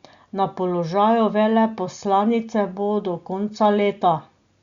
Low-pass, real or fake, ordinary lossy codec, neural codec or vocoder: 7.2 kHz; real; Opus, 64 kbps; none